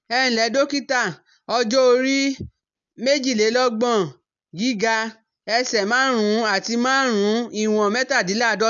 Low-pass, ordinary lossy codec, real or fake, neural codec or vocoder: 7.2 kHz; none; real; none